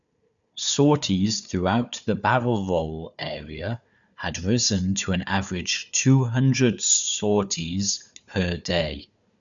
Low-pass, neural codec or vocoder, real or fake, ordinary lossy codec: 7.2 kHz; codec, 16 kHz, 4 kbps, FunCodec, trained on Chinese and English, 50 frames a second; fake; none